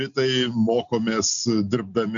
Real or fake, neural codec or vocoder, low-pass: real; none; 7.2 kHz